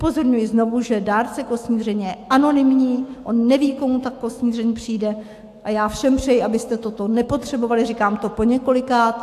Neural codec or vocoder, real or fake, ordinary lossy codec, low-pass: autoencoder, 48 kHz, 128 numbers a frame, DAC-VAE, trained on Japanese speech; fake; AAC, 64 kbps; 14.4 kHz